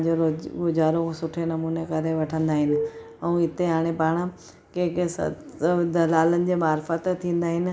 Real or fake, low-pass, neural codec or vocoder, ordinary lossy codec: real; none; none; none